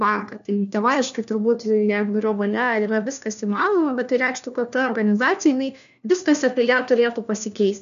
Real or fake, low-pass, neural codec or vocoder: fake; 7.2 kHz; codec, 16 kHz, 1 kbps, FunCodec, trained on LibriTTS, 50 frames a second